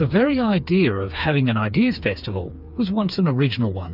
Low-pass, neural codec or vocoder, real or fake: 5.4 kHz; codec, 16 kHz, 4 kbps, FreqCodec, smaller model; fake